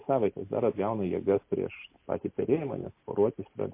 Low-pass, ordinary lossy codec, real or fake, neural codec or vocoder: 3.6 kHz; MP3, 24 kbps; real; none